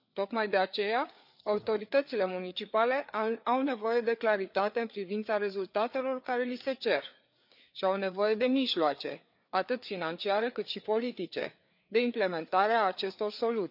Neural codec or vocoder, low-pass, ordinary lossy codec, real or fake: codec, 16 kHz, 4 kbps, FreqCodec, larger model; 5.4 kHz; none; fake